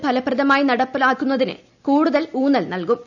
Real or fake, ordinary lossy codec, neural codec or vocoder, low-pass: real; none; none; 7.2 kHz